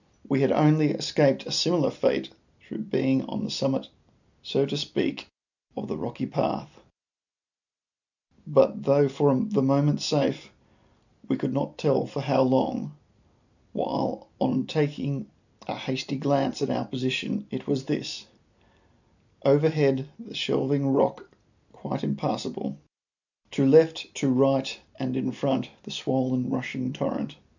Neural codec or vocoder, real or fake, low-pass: none; real; 7.2 kHz